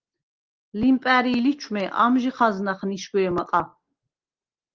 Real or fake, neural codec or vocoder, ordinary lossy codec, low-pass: real; none; Opus, 16 kbps; 7.2 kHz